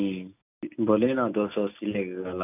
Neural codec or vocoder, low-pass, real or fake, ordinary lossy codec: none; 3.6 kHz; real; none